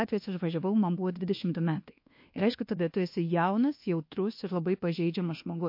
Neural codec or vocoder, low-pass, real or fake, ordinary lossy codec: codec, 24 kHz, 1.2 kbps, DualCodec; 5.4 kHz; fake; MP3, 32 kbps